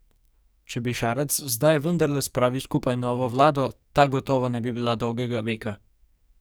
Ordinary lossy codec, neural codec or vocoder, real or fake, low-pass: none; codec, 44.1 kHz, 2.6 kbps, SNAC; fake; none